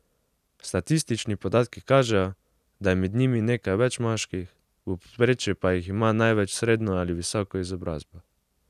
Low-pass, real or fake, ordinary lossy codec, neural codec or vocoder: 14.4 kHz; real; none; none